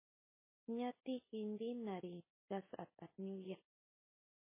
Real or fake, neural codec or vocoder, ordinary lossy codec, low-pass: real; none; MP3, 16 kbps; 3.6 kHz